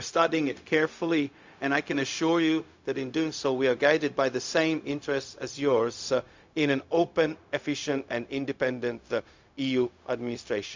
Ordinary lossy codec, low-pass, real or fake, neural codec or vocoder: MP3, 64 kbps; 7.2 kHz; fake; codec, 16 kHz, 0.4 kbps, LongCat-Audio-Codec